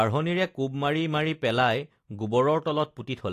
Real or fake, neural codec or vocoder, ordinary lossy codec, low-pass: real; none; AAC, 48 kbps; 14.4 kHz